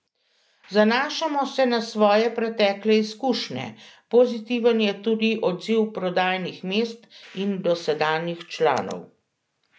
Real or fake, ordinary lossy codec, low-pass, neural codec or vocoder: real; none; none; none